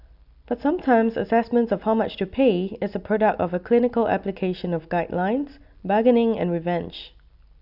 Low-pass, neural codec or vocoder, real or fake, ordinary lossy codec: 5.4 kHz; none; real; none